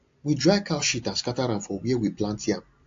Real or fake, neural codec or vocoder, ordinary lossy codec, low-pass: real; none; AAC, 48 kbps; 7.2 kHz